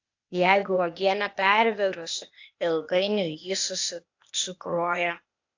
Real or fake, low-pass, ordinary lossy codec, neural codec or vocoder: fake; 7.2 kHz; AAC, 48 kbps; codec, 16 kHz, 0.8 kbps, ZipCodec